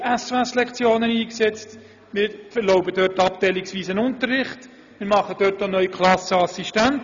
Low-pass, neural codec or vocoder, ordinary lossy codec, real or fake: 7.2 kHz; none; none; real